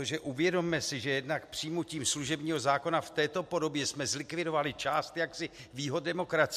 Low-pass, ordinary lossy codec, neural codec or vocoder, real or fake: 14.4 kHz; MP3, 64 kbps; none; real